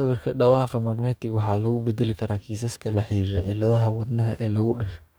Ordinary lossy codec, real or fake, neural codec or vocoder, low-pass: none; fake; codec, 44.1 kHz, 2.6 kbps, DAC; none